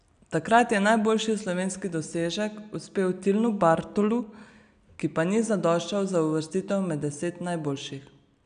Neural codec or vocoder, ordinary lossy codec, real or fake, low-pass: none; none; real; 9.9 kHz